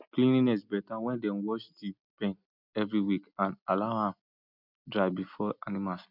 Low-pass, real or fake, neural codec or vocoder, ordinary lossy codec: 5.4 kHz; real; none; none